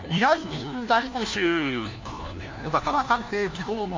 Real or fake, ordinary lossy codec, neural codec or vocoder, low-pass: fake; MP3, 64 kbps; codec, 16 kHz, 1 kbps, FunCodec, trained on LibriTTS, 50 frames a second; 7.2 kHz